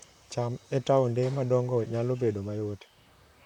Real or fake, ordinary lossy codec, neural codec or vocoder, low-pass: fake; none; vocoder, 44.1 kHz, 128 mel bands, Pupu-Vocoder; 19.8 kHz